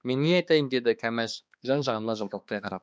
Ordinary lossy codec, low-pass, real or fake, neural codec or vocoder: none; none; fake; codec, 16 kHz, 2 kbps, X-Codec, HuBERT features, trained on balanced general audio